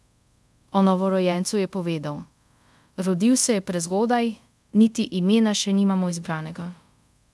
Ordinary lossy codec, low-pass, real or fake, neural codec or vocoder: none; none; fake; codec, 24 kHz, 0.5 kbps, DualCodec